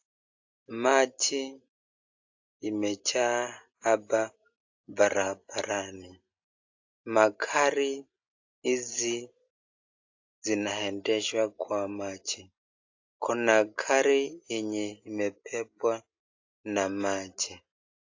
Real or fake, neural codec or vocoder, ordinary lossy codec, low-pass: real; none; AAC, 48 kbps; 7.2 kHz